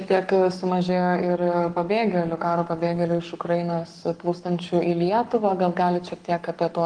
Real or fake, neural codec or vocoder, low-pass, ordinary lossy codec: fake; codec, 44.1 kHz, 7.8 kbps, Pupu-Codec; 9.9 kHz; Opus, 24 kbps